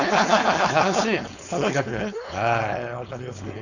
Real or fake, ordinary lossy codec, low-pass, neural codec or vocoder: fake; none; 7.2 kHz; codec, 16 kHz, 4.8 kbps, FACodec